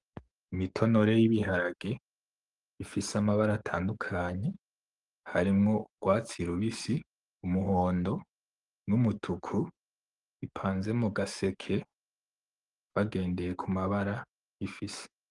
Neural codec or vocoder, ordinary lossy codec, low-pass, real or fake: codec, 44.1 kHz, 7.8 kbps, DAC; Opus, 24 kbps; 10.8 kHz; fake